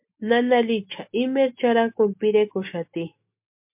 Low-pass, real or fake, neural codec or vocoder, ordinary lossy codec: 3.6 kHz; real; none; MP3, 32 kbps